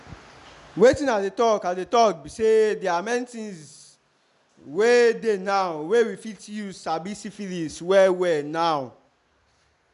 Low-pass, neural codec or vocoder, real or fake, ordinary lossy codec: 10.8 kHz; none; real; none